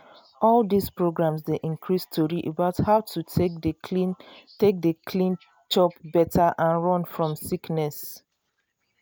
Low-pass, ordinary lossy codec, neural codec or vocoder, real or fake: none; none; none; real